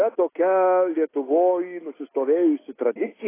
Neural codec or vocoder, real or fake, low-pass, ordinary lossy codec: none; real; 3.6 kHz; AAC, 16 kbps